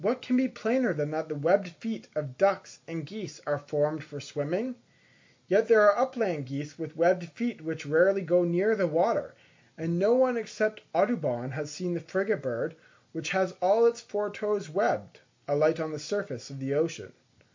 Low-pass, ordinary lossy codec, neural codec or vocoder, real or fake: 7.2 kHz; MP3, 48 kbps; none; real